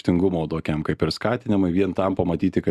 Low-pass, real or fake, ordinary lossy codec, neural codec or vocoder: 14.4 kHz; real; Opus, 64 kbps; none